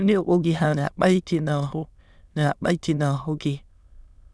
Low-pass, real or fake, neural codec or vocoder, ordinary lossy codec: none; fake; autoencoder, 22.05 kHz, a latent of 192 numbers a frame, VITS, trained on many speakers; none